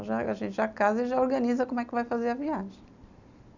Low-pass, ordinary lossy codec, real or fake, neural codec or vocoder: 7.2 kHz; none; real; none